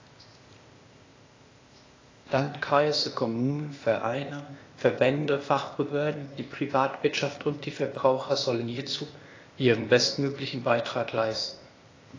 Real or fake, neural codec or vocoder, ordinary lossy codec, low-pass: fake; codec, 16 kHz, 0.8 kbps, ZipCodec; AAC, 32 kbps; 7.2 kHz